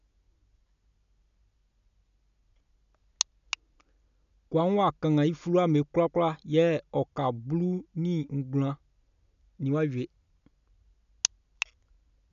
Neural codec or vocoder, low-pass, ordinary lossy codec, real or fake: none; 7.2 kHz; none; real